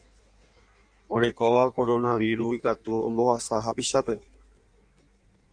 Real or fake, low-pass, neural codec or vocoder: fake; 9.9 kHz; codec, 16 kHz in and 24 kHz out, 1.1 kbps, FireRedTTS-2 codec